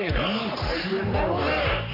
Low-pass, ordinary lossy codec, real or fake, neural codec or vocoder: 5.4 kHz; none; fake; codec, 44.1 kHz, 3.4 kbps, Pupu-Codec